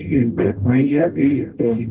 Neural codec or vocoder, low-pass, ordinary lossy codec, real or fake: codec, 44.1 kHz, 0.9 kbps, DAC; 3.6 kHz; Opus, 16 kbps; fake